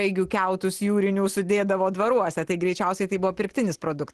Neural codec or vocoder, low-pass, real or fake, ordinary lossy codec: none; 10.8 kHz; real; Opus, 16 kbps